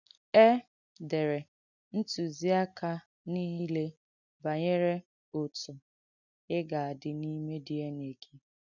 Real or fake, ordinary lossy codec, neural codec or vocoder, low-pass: real; none; none; 7.2 kHz